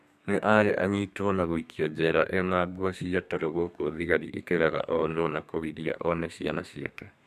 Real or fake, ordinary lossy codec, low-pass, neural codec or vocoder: fake; none; 14.4 kHz; codec, 32 kHz, 1.9 kbps, SNAC